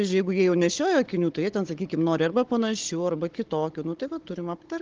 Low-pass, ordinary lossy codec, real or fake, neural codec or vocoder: 7.2 kHz; Opus, 16 kbps; fake; codec, 16 kHz, 16 kbps, FunCodec, trained on Chinese and English, 50 frames a second